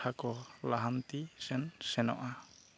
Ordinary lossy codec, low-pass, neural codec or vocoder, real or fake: none; none; none; real